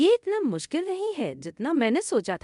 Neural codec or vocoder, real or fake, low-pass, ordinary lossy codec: codec, 24 kHz, 0.5 kbps, DualCodec; fake; 10.8 kHz; MP3, 64 kbps